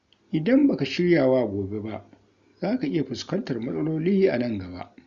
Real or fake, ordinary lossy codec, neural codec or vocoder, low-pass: real; Opus, 64 kbps; none; 7.2 kHz